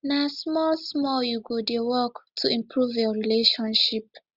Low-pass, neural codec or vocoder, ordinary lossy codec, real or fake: 5.4 kHz; none; Opus, 64 kbps; real